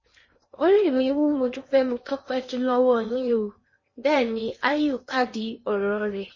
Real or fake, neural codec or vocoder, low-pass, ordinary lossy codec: fake; codec, 16 kHz in and 24 kHz out, 0.8 kbps, FocalCodec, streaming, 65536 codes; 7.2 kHz; MP3, 32 kbps